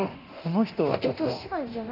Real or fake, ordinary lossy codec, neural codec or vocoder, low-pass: fake; AAC, 48 kbps; codec, 24 kHz, 0.9 kbps, DualCodec; 5.4 kHz